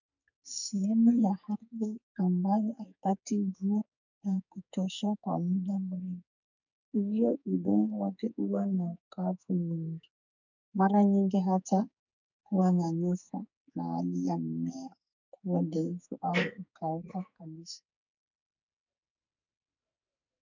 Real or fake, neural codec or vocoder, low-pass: fake; codec, 44.1 kHz, 2.6 kbps, SNAC; 7.2 kHz